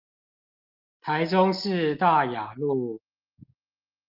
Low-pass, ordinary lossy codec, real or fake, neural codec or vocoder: 5.4 kHz; Opus, 32 kbps; real; none